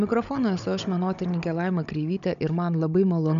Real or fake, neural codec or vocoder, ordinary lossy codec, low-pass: fake; codec, 16 kHz, 16 kbps, FunCodec, trained on Chinese and English, 50 frames a second; MP3, 96 kbps; 7.2 kHz